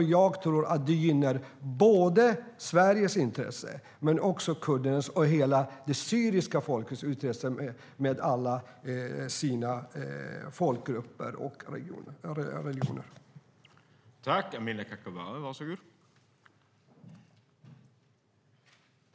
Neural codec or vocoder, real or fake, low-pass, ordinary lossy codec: none; real; none; none